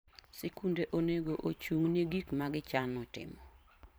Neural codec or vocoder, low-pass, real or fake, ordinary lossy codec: vocoder, 44.1 kHz, 128 mel bands every 512 samples, BigVGAN v2; none; fake; none